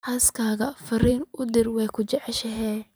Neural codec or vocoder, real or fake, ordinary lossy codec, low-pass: vocoder, 44.1 kHz, 128 mel bands every 512 samples, BigVGAN v2; fake; none; none